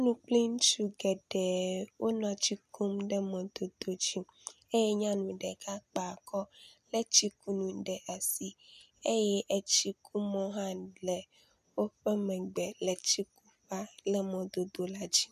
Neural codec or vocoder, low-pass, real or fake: none; 14.4 kHz; real